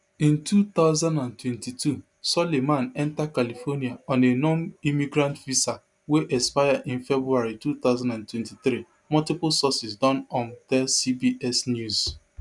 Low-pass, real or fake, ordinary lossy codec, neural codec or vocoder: 10.8 kHz; real; none; none